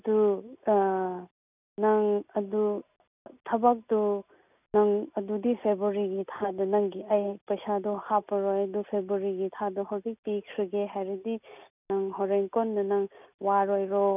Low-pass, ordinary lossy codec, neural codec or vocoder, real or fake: 3.6 kHz; none; none; real